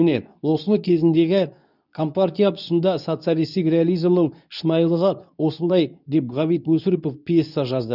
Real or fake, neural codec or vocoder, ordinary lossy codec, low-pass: fake; codec, 24 kHz, 0.9 kbps, WavTokenizer, medium speech release version 2; none; 5.4 kHz